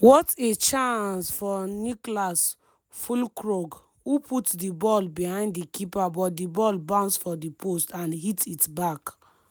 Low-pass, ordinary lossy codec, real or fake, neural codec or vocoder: none; none; real; none